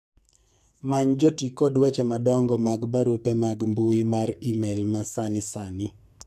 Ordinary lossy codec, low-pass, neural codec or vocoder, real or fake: MP3, 96 kbps; 14.4 kHz; codec, 44.1 kHz, 2.6 kbps, SNAC; fake